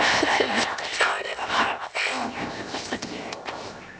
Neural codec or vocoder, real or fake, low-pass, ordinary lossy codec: codec, 16 kHz, 0.7 kbps, FocalCodec; fake; none; none